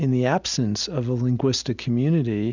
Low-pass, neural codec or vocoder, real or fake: 7.2 kHz; none; real